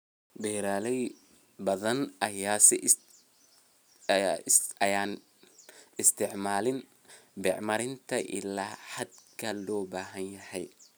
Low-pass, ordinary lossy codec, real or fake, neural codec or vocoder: none; none; real; none